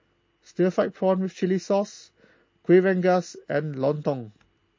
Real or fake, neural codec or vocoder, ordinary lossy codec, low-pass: real; none; MP3, 32 kbps; 7.2 kHz